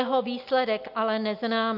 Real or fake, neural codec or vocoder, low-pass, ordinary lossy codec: real; none; 5.4 kHz; AAC, 48 kbps